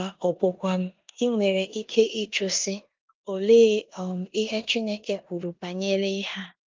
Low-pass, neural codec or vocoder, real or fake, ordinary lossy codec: 7.2 kHz; codec, 16 kHz in and 24 kHz out, 0.9 kbps, LongCat-Audio-Codec, four codebook decoder; fake; Opus, 32 kbps